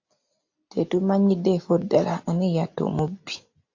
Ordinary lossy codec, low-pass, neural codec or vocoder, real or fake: AAC, 48 kbps; 7.2 kHz; none; real